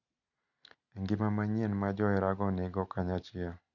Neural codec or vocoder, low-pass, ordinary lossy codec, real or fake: none; 7.2 kHz; none; real